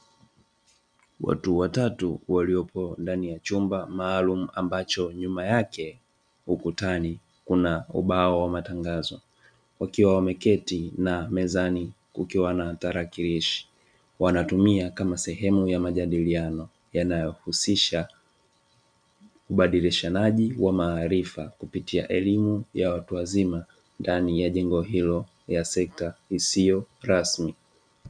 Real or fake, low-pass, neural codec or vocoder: real; 9.9 kHz; none